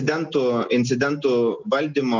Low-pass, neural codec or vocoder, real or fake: 7.2 kHz; none; real